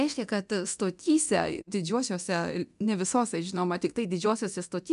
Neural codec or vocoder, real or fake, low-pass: codec, 24 kHz, 0.9 kbps, DualCodec; fake; 10.8 kHz